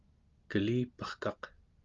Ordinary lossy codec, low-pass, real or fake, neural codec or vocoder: Opus, 32 kbps; 7.2 kHz; real; none